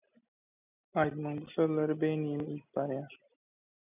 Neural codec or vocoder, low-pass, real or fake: none; 3.6 kHz; real